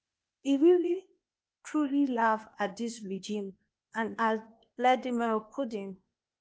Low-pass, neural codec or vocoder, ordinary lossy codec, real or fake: none; codec, 16 kHz, 0.8 kbps, ZipCodec; none; fake